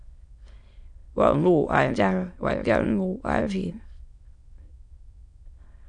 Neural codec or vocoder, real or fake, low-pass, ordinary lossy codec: autoencoder, 22.05 kHz, a latent of 192 numbers a frame, VITS, trained on many speakers; fake; 9.9 kHz; AAC, 64 kbps